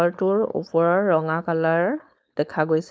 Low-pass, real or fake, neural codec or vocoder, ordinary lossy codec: none; fake; codec, 16 kHz, 4.8 kbps, FACodec; none